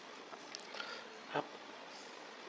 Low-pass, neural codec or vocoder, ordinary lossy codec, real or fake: none; codec, 16 kHz, 16 kbps, FreqCodec, larger model; none; fake